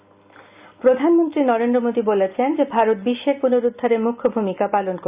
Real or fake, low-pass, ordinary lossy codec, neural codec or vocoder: real; 3.6 kHz; Opus, 64 kbps; none